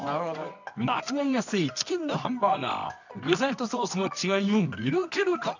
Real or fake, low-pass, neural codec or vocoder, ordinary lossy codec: fake; 7.2 kHz; codec, 24 kHz, 0.9 kbps, WavTokenizer, medium music audio release; none